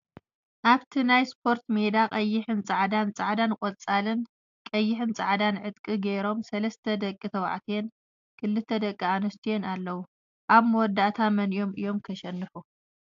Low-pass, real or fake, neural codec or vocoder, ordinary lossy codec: 7.2 kHz; real; none; AAC, 64 kbps